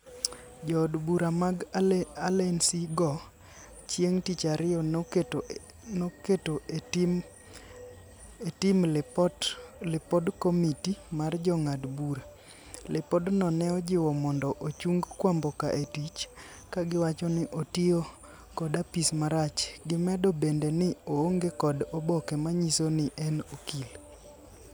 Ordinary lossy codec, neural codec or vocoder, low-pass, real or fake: none; none; none; real